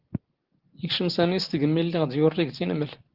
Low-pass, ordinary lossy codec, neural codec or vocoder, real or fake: 5.4 kHz; Opus, 16 kbps; none; real